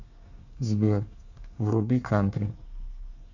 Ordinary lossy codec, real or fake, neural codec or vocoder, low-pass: Opus, 64 kbps; fake; codec, 24 kHz, 1 kbps, SNAC; 7.2 kHz